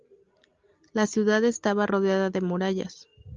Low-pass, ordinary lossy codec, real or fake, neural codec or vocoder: 7.2 kHz; Opus, 32 kbps; real; none